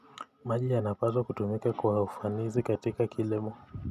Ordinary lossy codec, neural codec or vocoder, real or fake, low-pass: none; none; real; 14.4 kHz